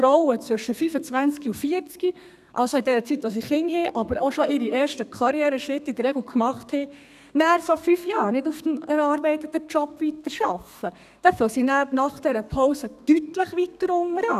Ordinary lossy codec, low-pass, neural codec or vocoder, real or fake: none; 14.4 kHz; codec, 32 kHz, 1.9 kbps, SNAC; fake